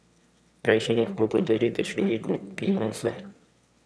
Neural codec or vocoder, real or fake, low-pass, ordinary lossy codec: autoencoder, 22.05 kHz, a latent of 192 numbers a frame, VITS, trained on one speaker; fake; none; none